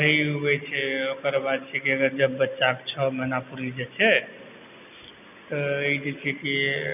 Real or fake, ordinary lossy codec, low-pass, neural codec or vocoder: real; none; 3.6 kHz; none